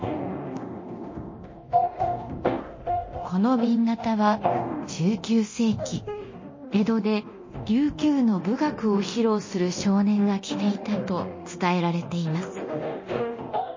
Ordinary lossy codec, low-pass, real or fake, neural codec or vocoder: MP3, 32 kbps; 7.2 kHz; fake; codec, 24 kHz, 0.9 kbps, DualCodec